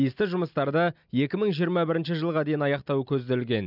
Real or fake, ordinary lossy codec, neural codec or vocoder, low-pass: real; none; none; 5.4 kHz